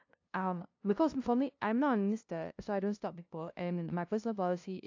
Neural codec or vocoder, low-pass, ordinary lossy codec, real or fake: codec, 16 kHz, 0.5 kbps, FunCodec, trained on LibriTTS, 25 frames a second; 7.2 kHz; AAC, 48 kbps; fake